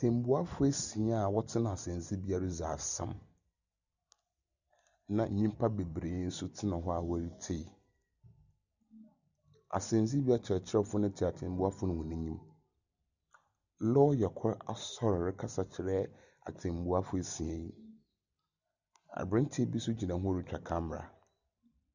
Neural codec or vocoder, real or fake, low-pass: none; real; 7.2 kHz